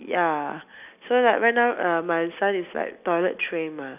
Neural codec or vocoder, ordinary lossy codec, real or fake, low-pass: none; none; real; 3.6 kHz